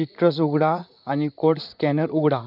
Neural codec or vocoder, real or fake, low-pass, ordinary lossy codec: vocoder, 44.1 kHz, 128 mel bands, Pupu-Vocoder; fake; 5.4 kHz; none